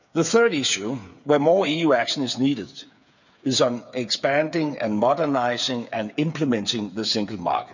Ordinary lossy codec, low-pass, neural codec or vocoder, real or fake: none; 7.2 kHz; codec, 16 kHz, 8 kbps, FreqCodec, smaller model; fake